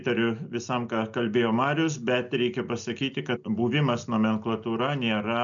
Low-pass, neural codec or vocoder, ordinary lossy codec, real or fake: 7.2 kHz; none; MP3, 96 kbps; real